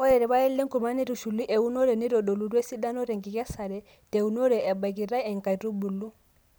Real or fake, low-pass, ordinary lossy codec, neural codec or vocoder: real; none; none; none